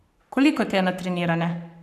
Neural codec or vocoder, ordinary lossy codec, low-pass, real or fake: codec, 44.1 kHz, 7.8 kbps, Pupu-Codec; none; 14.4 kHz; fake